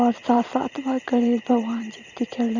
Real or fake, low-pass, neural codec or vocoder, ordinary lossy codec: fake; 7.2 kHz; vocoder, 44.1 kHz, 128 mel bands every 512 samples, BigVGAN v2; Opus, 64 kbps